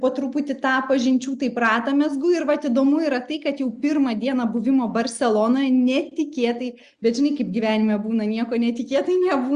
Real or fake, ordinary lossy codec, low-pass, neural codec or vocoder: real; Opus, 64 kbps; 9.9 kHz; none